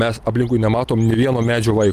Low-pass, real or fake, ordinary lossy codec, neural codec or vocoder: 14.4 kHz; real; Opus, 32 kbps; none